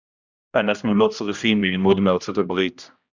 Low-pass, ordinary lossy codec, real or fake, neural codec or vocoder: 7.2 kHz; Opus, 64 kbps; fake; codec, 16 kHz, 1 kbps, X-Codec, HuBERT features, trained on general audio